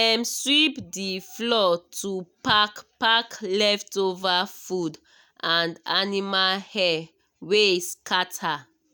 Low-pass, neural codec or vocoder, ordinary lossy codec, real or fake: none; none; none; real